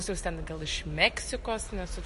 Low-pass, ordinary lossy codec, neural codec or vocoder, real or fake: 14.4 kHz; MP3, 48 kbps; none; real